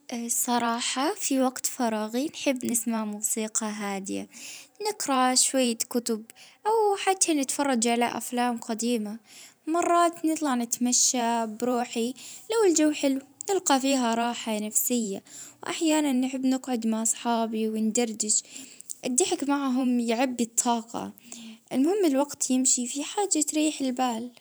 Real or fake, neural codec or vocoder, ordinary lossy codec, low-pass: fake; vocoder, 44.1 kHz, 128 mel bands every 512 samples, BigVGAN v2; none; none